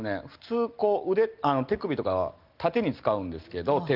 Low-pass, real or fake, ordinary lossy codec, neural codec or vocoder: 5.4 kHz; real; Opus, 32 kbps; none